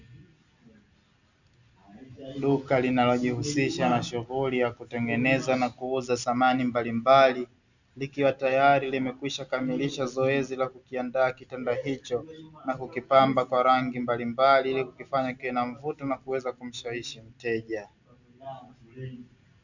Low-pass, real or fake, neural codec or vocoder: 7.2 kHz; real; none